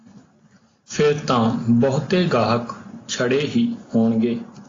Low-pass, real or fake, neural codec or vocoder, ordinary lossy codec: 7.2 kHz; real; none; AAC, 32 kbps